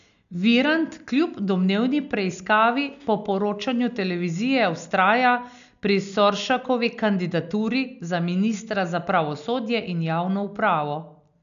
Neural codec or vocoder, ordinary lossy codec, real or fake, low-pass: none; none; real; 7.2 kHz